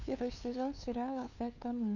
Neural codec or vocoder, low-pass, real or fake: codec, 16 kHz, 2 kbps, FunCodec, trained on LibriTTS, 25 frames a second; 7.2 kHz; fake